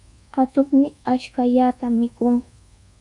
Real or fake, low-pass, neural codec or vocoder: fake; 10.8 kHz; codec, 24 kHz, 1.2 kbps, DualCodec